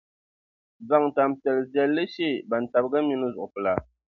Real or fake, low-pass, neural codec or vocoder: real; 7.2 kHz; none